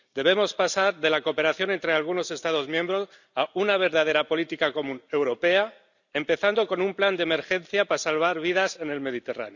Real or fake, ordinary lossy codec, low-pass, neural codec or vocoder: real; none; 7.2 kHz; none